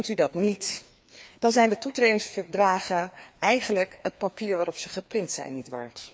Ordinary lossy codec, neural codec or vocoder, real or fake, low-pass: none; codec, 16 kHz, 2 kbps, FreqCodec, larger model; fake; none